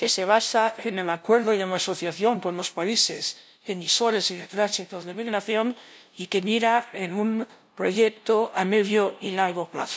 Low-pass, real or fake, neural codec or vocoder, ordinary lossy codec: none; fake; codec, 16 kHz, 0.5 kbps, FunCodec, trained on LibriTTS, 25 frames a second; none